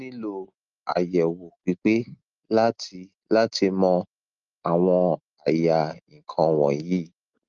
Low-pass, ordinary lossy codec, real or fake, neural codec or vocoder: 7.2 kHz; Opus, 32 kbps; real; none